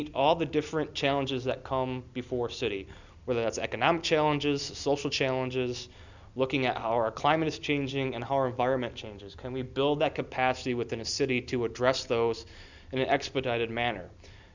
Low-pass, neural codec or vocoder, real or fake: 7.2 kHz; none; real